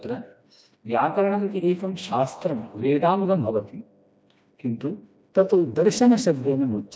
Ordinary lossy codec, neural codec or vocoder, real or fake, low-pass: none; codec, 16 kHz, 1 kbps, FreqCodec, smaller model; fake; none